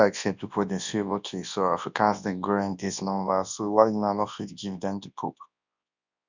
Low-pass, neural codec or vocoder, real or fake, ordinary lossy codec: 7.2 kHz; codec, 24 kHz, 0.9 kbps, WavTokenizer, large speech release; fake; none